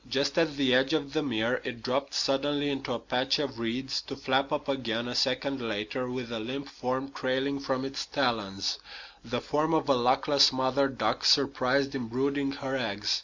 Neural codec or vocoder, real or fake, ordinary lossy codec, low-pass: none; real; Opus, 64 kbps; 7.2 kHz